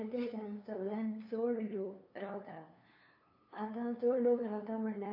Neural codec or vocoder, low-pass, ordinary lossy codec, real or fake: codec, 16 kHz, 2 kbps, FunCodec, trained on LibriTTS, 25 frames a second; 5.4 kHz; AAC, 32 kbps; fake